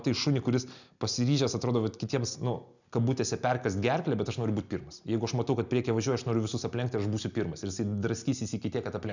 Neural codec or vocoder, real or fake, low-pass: none; real; 7.2 kHz